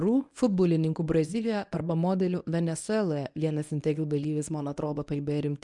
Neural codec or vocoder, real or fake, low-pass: codec, 24 kHz, 0.9 kbps, WavTokenizer, medium speech release version 1; fake; 10.8 kHz